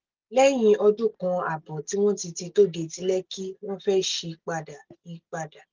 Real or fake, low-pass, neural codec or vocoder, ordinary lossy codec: real; 7.2 kHz; none; Opus, 32 kbps